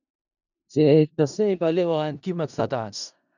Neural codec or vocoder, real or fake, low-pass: codec, 16 kHz in and 24 kHz out, 0.4 kbps, LongCat-Audio-Codec, four codebook decoder; fake; 7.2 kHz